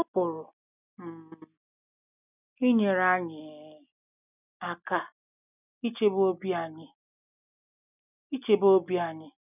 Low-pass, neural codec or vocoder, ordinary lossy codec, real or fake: 3.6 kHz; none; none; real